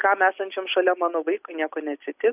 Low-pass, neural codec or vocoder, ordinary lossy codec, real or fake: 3.6 kHz; none; AAC, 32 kbps; real